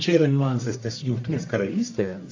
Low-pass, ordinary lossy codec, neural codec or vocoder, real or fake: 7.2 kHz; AAC, 48 kbps; codec, 44.1 kHz, 1.7 kbps, Pupu-Codec; fake